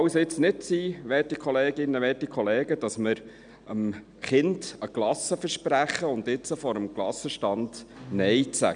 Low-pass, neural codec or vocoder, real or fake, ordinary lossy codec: 9.9 kHz; none; real; none